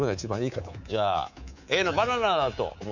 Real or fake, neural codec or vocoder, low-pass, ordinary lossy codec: fake; codec, 24 kHz, 3.1 kbps, DualCodec; 7.2 kHz; none